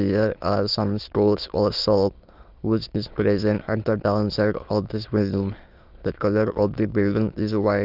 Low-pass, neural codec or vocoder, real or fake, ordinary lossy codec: 5.4 kHz; autoencoder, 22.05 kHz, a latent of 192 numbers a frame, VITS, trained on many speakers; fake; Opus, 24 kbps